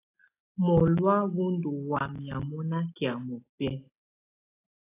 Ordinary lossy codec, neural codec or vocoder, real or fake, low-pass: AAC, 24 kbps; none; real; 3.6 kHz